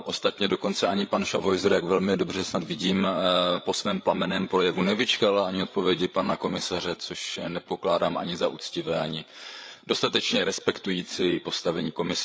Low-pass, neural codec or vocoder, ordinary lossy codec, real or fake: none; codec, 16 kHz, 8 kbps, FreqCodec, larger model; none; fake